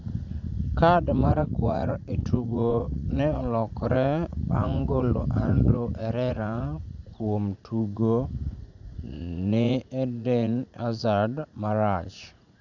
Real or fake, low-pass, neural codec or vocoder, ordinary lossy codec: fake; 7.2 kHz; vocoder, 44.1 kHz, 80 mel bands, Vocos; none